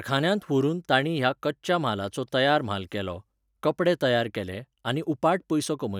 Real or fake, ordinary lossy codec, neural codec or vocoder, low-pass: real; none; none; 19.8 kHz